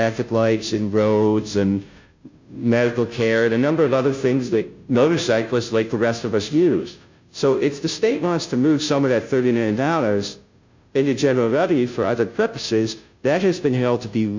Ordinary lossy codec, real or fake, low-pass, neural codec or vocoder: MP3, 64 kbps; fake; 7.2 kHz; codec, 16 kHz, 0.5 kbps, FunCodec, trained on Chinese and English, 25 frames a second